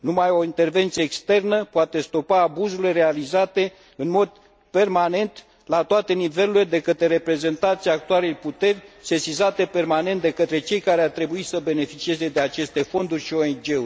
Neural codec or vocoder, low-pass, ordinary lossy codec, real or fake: none; none; none; real